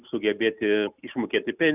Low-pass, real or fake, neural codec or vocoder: 3.6 kHz; real; none